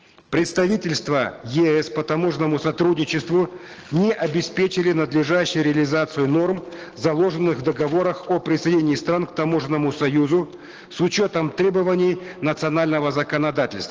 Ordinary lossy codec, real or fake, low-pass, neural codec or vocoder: Opus, 16 kbps; real; 7.2 kHz; none